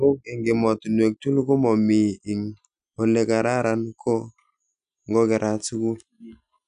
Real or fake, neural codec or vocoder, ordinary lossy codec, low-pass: real; none; none; 9.9 kHz